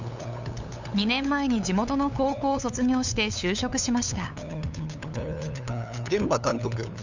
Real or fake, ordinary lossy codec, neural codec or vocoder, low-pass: fake; none; codec, 16 kHz, 8 kbps, FunCodec, trained on LibriTTS, 25 frames a second; 7.2 kHz